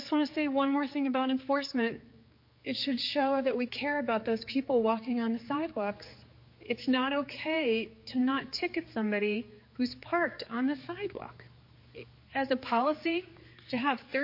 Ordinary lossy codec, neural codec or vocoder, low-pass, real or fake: MP3, 32 kbps; codec, 16 kHz, 4 kbps, X-Codec, HuBERT features, trained on general audio; 5.4 kHz; fake